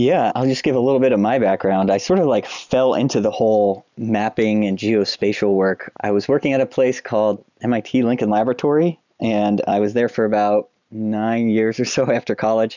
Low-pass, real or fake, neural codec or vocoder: 7.2 kHz; real; none